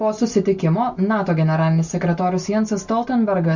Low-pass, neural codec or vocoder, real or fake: 7.2 kHz; none; real